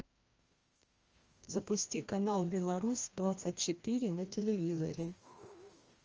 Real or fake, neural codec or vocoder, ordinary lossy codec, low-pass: fake; codec, 16 kHz, 1 kbps, FreqCodec, larger model; Opus, 24 kbps; 7.2 kHz